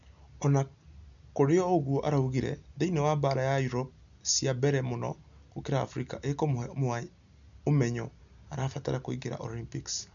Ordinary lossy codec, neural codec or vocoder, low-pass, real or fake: none; none; 7.2 kHz; real